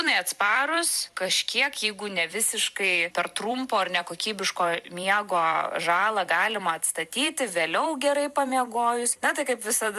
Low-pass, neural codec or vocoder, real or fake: 14.4 kHz; vocoder, 44.1 kHz, 128 mel bands every 256 samples, BigVGAN v2; fake